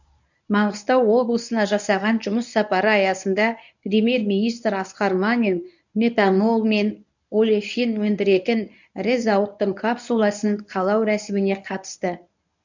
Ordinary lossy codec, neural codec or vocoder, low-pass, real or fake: none; codec, 24 kHz, 0.9 kbps, WavTokenizer, medium speech release version 1; 7.2 kHz; fake